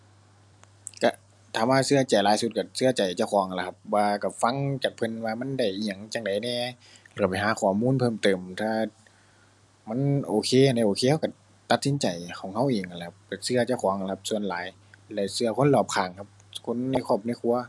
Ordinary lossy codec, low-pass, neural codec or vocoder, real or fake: none; none; none; real